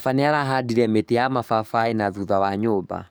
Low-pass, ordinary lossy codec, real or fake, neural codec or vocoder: none; none; fake; codec, 44.1 kHz, 7.8 kbps, DAC